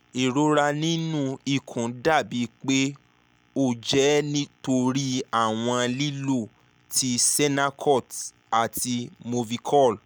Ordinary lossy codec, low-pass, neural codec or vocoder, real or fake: none; none; none; real